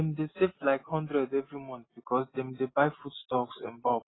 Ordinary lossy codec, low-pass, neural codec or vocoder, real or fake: AAC, 16 kbps; 7.2 kHz; none; real